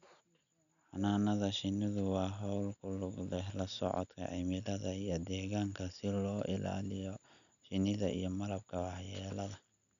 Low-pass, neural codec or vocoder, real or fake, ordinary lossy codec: 7.2 kHz; none; real; none